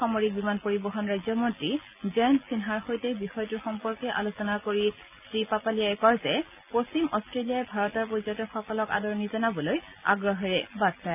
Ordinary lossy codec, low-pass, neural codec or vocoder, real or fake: none; 3.6 kHz; none; real